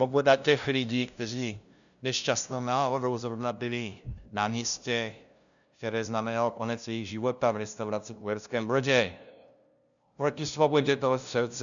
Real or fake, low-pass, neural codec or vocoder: fake; 7.2 kHz; codec, 16 kHz, 0.5 kbps, FunCodec, trained on LibriTTS, 25 frames a second